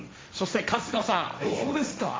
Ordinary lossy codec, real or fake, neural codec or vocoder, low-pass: none; fake; codec, 16 kHz, 1.1 kbps, Voila-Tokenizer; none